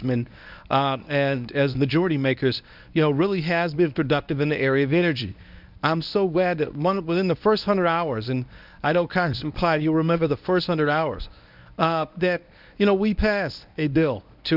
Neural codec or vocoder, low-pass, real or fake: codec, 24 kHz, 0.9 kbps, WavTokenizer, medium speech release version 1; 5.4 kHz; fake